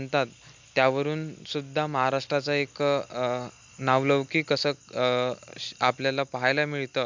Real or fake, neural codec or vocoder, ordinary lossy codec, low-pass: real; none; MP3, 64 kbps; 7.2 kHz